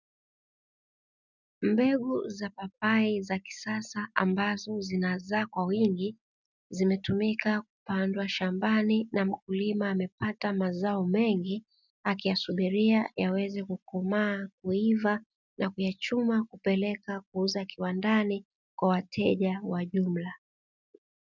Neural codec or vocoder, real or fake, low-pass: none; real; 7.2 kHz